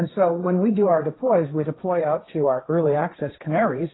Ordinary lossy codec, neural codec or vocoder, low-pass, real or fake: AAC, 16 kbps; codec, 16 kHz, 1.1 kbps, Voila-Tokenizer; 7.2 kHz; fake